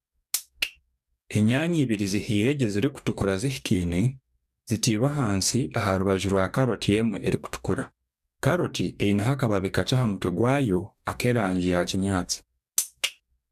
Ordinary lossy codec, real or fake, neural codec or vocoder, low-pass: none; fake; codec, 44.1 kHz, 2.6 kbps, DAC; 14.4 kHz